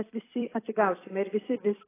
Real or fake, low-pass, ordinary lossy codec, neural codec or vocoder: fake; 3.6 kHz; AAC, 16 kbps; vocoder, 44.1 kHz, 128 mel bands every 256 samples, BigVGAN v2